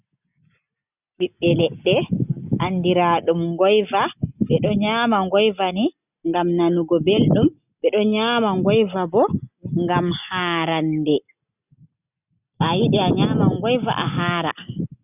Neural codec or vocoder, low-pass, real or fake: none; 3.6 kHz; real